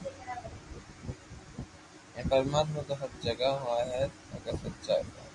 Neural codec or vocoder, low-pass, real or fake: none; 10.8 kHz; real